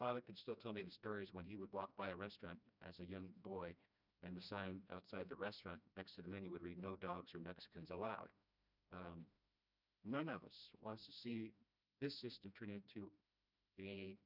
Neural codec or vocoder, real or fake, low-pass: codec, 16 kHz, 1 kbps, FreqCodec, smaller model; fake; 5.4 kHz